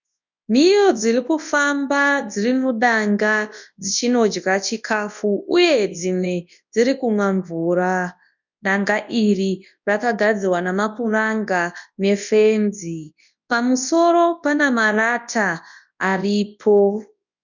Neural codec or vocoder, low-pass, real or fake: codec, 24 kHz, 0.9 kbps, WavTokenizer, large speech release; 7.2 kHz; fake